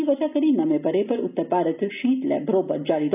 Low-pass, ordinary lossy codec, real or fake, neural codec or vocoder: 3.6 kHz; none; real; none